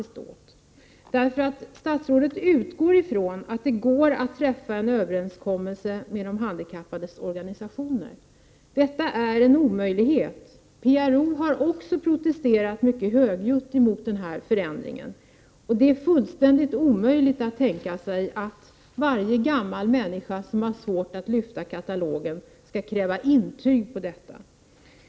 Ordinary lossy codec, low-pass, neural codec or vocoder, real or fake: none; none; none; real